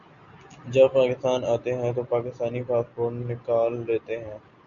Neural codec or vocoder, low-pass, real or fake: none; 7.2 kHz; real